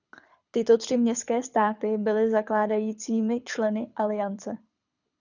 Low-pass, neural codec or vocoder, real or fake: 7.2 kHz; codec, 24 kHz, 6 kbps, HILCodec; fake